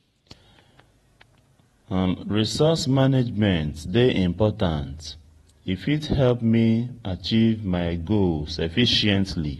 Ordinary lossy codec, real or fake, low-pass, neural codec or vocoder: AAC, 32 kbps; fake; 19.8 kHz; vocoder, 48 kHz, 128 mel bands, Vocos